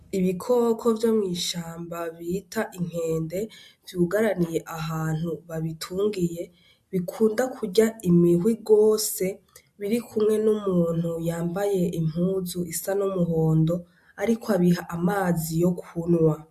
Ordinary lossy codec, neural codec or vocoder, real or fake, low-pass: MP3, 64 kbps; none; real; 14.4 kHz